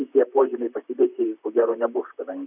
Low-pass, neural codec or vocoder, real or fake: 3.6 kHz; vocoder, 44.1 kHz, 128 mel bands, Pupu-Vocoder; fake